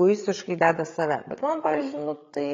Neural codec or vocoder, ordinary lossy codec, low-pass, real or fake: codec, 16 kHz, 8 kbps, FreqCodec, larger model; AAC, 32 kbps; 7.2 kHz; fake